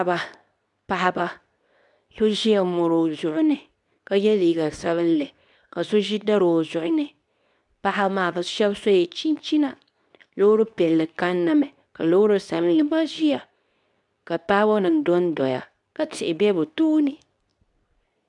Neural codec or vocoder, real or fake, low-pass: codec, 24 kHz, 0.9 kbps, WavTokenizer, medium speech release version 2; fake; 10.8 kHz